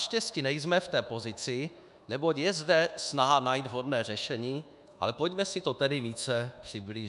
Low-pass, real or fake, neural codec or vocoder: 10.8 kHz; fake; codec, 24 kHz, 1.2 kbps, DualCodec